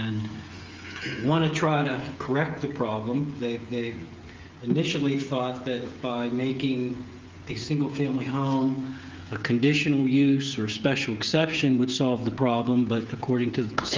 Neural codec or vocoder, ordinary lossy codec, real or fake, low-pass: codec, 16 kHz, 8 kbps, FreqCodec, smaller model; Opus, 32 kbps; fake; 7.2 kHz